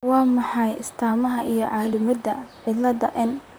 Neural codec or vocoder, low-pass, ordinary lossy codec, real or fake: vocoder, 44.1 kHz, 128 mel bands, Pupu-Vocoder; none; none; fake